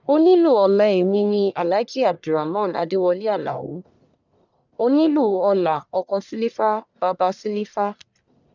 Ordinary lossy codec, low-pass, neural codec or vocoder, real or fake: none; 7.2 kHz; codec, 44.1 kHz, 1.7 kbps, Pupu-Codec; fake